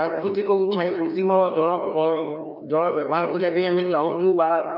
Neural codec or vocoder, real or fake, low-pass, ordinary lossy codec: codec, 16 kHz, 1 kbps, FreqCodec, larger model; fake; 5.4 kHz; none